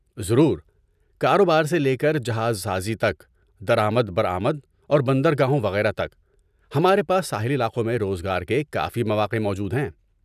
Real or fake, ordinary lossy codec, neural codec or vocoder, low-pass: real; none; none; 14.4 kHz